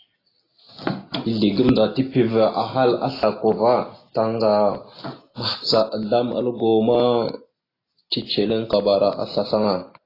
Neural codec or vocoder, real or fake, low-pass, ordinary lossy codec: none; real; 5.4 kHz; AAC, 24 kbps